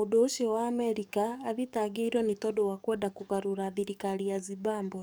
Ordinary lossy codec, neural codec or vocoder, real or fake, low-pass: none; codec, 44.1 kHz, 7.8 kbps, DAC; fake; none